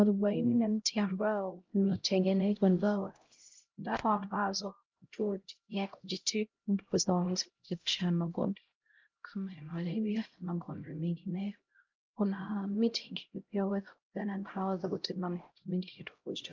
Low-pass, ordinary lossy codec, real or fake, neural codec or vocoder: 7.2 kHz; Opus, 24 kbps; fake; codec, 16 kHz, 0.5 kbps, X-Codec, HuBERT features, trained on LibriSpeech